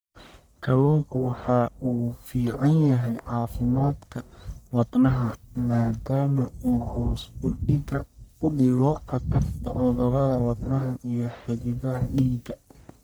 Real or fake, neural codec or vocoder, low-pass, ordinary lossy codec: fake; codec, 44.1 kHz, 1.7 kbps, Pupu-Codec; none; none